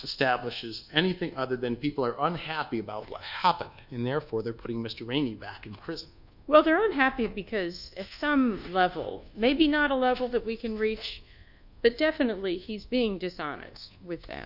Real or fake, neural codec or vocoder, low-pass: fake; codec, 24 kHz, 1.2 kbps, DualCodec; 5.4 kHz